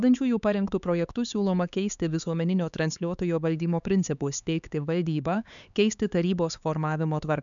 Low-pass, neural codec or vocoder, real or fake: 7.2 kHz; codec, 16 kHz, 4 kbps, X-Codec, HuBERT features, trained on LibriSpeech; fake